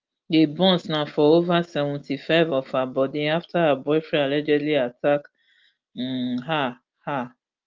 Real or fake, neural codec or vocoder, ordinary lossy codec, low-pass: real; none; Opus, 32 kbps; 7.2 kHz